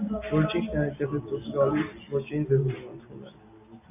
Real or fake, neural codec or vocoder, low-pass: real; none; 3.6 kHz